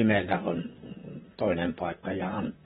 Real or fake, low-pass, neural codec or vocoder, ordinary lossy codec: fake; 19.8 kHz; vocoder, 44.1 kHz, 128 mel bands, Pupu-Vocoder; AAC, 16 kbps